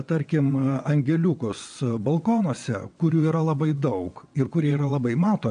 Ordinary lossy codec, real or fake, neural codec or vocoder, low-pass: AAC, 64 kbps; fake; vocoder, 22.05 kHz, 80 mel bands, WaveNeXt; 9.9 kHz